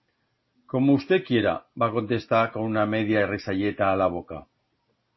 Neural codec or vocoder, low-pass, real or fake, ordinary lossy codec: none; 7.2 kHz; real; MP3, 24 kbps